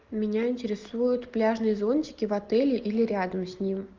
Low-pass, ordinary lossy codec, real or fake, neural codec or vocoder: 7.2 kHz; Opus, 24 kbps; real; none